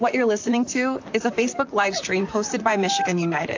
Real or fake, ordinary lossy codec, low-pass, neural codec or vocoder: fake; AAC, 48 kbps; 7.2 kHz; vocoder, 44.1 kHz, 128 mel bands, Pupu-Vocoder